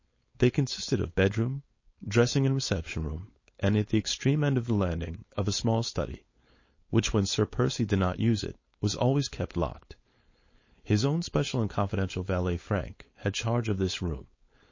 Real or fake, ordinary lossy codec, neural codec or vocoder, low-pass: fake; MP3, 32 kbps; codec, 16 kHz, 4.8 kbps, FACodec; 7.2 kHz